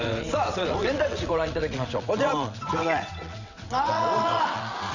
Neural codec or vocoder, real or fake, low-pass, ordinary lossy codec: vocoder, 22.05 kHz, 80 mel bands, WaveNeXt; fake; 7.2 kHz; none